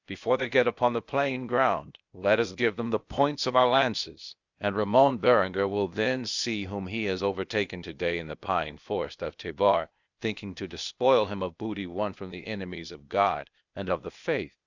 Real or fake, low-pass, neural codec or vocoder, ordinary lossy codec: fake; 7.2 kHz; codec, 16 kHz, 0.8 kbps, ZipCodec; Opus, 64 kbps